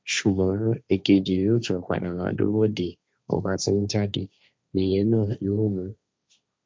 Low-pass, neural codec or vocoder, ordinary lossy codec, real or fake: none; codec, 16 kHz, 1.1 kbps, Voila-Tokenizer; none; fake